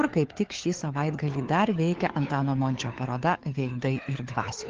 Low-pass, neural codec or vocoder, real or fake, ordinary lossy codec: 7.2 kHz; codec, 16 kHz, 4 kbps, FunCodec, trained on LibriTTS, 50 frames a second; fake; Opus, 16 kbps